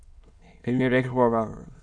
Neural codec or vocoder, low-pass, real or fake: autoencoder, 22.05 kHz, a latent of 192 numbers a frame, VITS, trained on many speakers; 9.9 kHz; fake